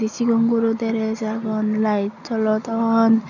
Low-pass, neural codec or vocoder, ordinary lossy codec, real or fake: 7.2 kHz; none; none; real